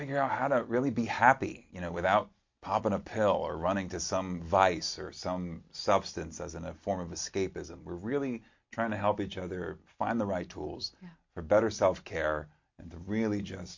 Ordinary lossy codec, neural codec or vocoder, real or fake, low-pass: MP3, 48 kbps; none; real; 7.2 kHz